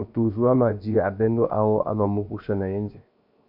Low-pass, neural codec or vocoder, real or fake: 5.4 kHz; codec, 16 kHz, 0.7 kbps, FocalCodec; fake